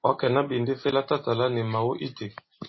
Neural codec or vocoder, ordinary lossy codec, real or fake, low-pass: none; MP3, 24 kbps; real; 7.2 kHz